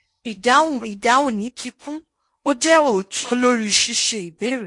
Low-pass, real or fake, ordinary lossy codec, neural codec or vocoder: 10.8 kHz; fake; MP3, 48 kbps; codec, 16 kHz in and 24 kHz out, 0.8 kbps, FocalCodec, streaming, 65536 codes